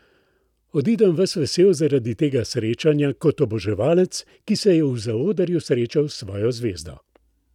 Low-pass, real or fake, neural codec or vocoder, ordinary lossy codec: 19.8 kHz; real; none; none